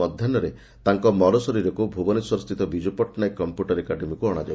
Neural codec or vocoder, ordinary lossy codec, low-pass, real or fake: none; none; none; real